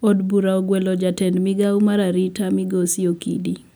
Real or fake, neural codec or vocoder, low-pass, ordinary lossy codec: real; none; none; none